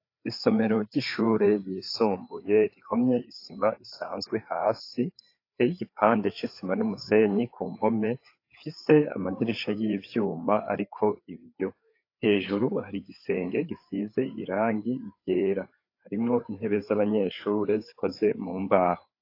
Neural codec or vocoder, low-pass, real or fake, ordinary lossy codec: codec, 16 kHz, 4 kbps, FreqCodec, larger model; 5.4 kHz; fake; AAC, 32 kbps